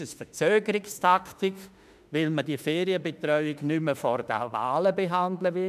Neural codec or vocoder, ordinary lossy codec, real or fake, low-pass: autoencoder, 48 kHz, 32 numbers a frame, DAC-VAE, trained on Japanese speech; none; fake; 14.4 kHz